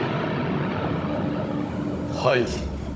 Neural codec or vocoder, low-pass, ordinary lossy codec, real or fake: codec, 16 kHz, 16 kbps, FunCodec, trained on Chinese and English, 50 frames a second; none; none; fake